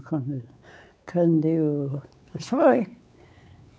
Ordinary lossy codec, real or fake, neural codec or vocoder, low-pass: none; fake; codec, 16 kHz, 4 kbps, X-Codec, WavLM features, trained on Multilingual LibriSpeech; none